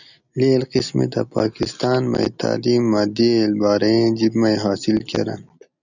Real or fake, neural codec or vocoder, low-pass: real; none; 7.2 kHz